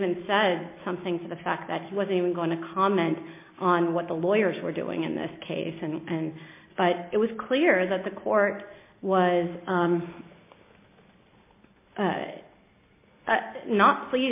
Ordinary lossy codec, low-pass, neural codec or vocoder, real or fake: MP3, 24 kbps; 3.6 kHz; none; real